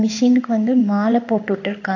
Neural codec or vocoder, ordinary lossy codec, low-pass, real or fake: codec, 16 kHz in and 24 kHz out, 1 kbps, XY-Tokenizer; AAC, 48 kbps; 7.2 kHz; fake